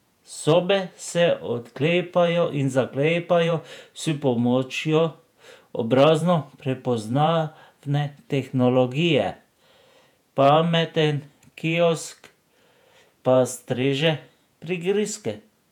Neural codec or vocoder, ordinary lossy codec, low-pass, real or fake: vocoder, 48 kHz, 128 mel bands, Vocos; none; 19.8 kHz; fake